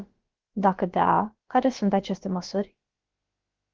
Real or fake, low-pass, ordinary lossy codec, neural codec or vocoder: fake; 7.2 kHz; Opus, 16 kbps; codec, 16 kHz, about 1 kbps, DyCAST, with the encoder's durations